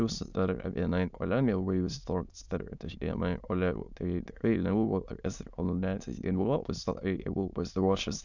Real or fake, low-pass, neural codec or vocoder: fake; 7.2 kHz; autoencoder, 22.05 kHz, a latent of 192 numbers a frame, VITS, trained on many speakers